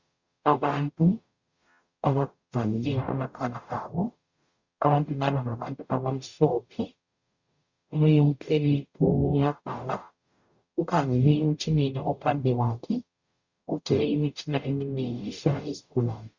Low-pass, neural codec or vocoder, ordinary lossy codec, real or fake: 7.2 kHz; codec, 44.1 kHz, 0.9 kbps, DAC; Opus, 64 kbps; fake